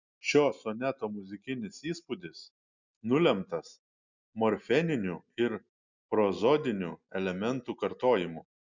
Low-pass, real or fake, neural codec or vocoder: 7.2 kHz; real; none